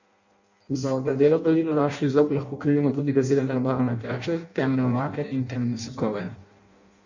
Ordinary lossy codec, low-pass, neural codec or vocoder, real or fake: none; 7.2 kHz; codec, 16 kHz in and 24 kHz out, 0.6 kbps, FireRedTTS-2 codec; fake